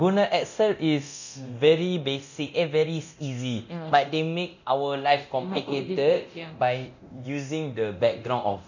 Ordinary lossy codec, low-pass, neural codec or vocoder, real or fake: none; 7.2 kHz; codec, 24 kHz, 0.9 kbps, DualCodec; fake